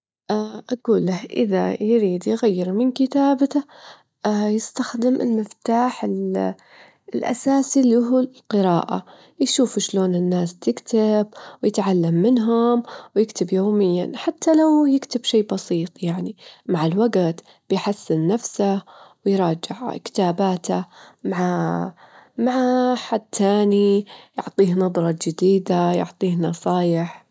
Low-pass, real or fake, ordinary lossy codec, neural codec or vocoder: none; real; none; none